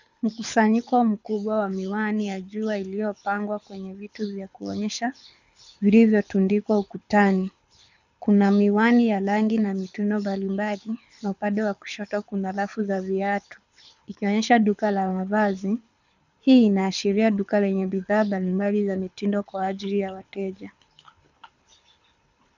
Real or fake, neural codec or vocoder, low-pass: fake; codec, 24 kHz, 6 kbps, HILCodec; 7.2 kHz